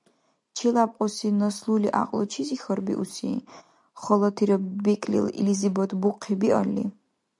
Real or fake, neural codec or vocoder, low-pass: real; none; 10.8 kHz